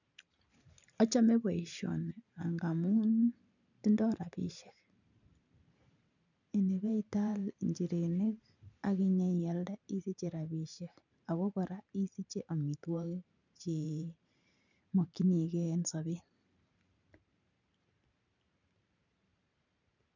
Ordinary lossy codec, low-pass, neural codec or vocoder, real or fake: none; 7.2 kHz; vocoder, 44.1 kHz, 128 mel bands every 512 samples, BigVGAN v2; fake